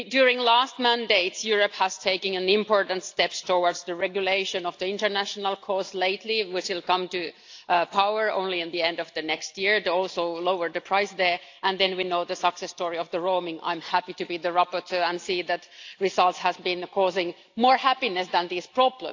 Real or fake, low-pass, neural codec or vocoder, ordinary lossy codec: real; 7.2 kHz; none; AAC, 48 kbps